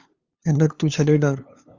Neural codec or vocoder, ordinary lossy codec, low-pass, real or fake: codec, 16 kHz, 2 kbps, FunCodec, trained on LibriTTS, 25 frames a second; Opus, 32 kbps; 7.2 kHz; fake